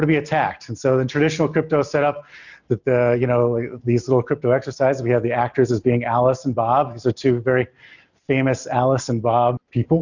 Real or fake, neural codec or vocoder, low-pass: real; none; 7.2 kHz